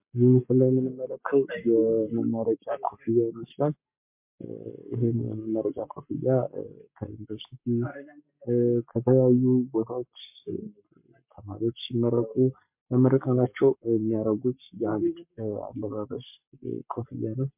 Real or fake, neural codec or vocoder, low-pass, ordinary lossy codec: fake; codec, 44.1 kHz, 7.8 kbps, Pupu-Codec; 3.6 kHz; MP3, 32 kbps